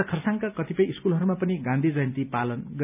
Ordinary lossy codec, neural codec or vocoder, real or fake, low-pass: none; none; real; 3.6 kHz